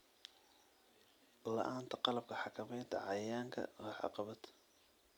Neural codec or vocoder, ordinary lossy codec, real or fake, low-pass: none; none; real; none